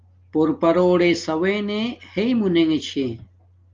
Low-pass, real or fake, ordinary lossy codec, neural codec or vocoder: 7.2 kHz; real; Opus, 32 kbps; none